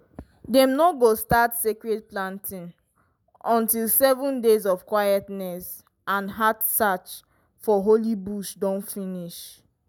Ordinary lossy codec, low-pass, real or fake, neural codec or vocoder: none; none; real; none